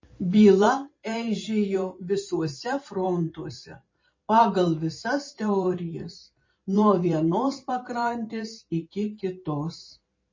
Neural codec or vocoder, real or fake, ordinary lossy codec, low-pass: vocoder, 44.1 kHz, 128 mel bands every 512 samples, BigVGAN v2; fake; MP3, 32 kbps; 7.2 kHz